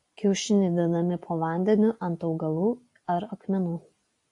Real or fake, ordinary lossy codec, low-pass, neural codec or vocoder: real; AAC, 64 kbps; 10.8 kHz; none